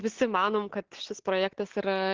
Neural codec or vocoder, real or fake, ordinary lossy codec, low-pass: none; real; Opus, 16 kbps; 7.2 kHz